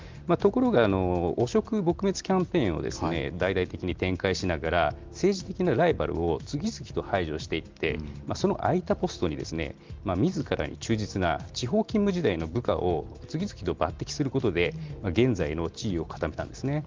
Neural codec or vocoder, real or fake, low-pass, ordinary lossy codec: none; real; 7.2 kHz; Opus, 32 kbps